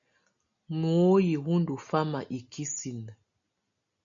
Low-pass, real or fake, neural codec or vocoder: 7.2 kHz; real; none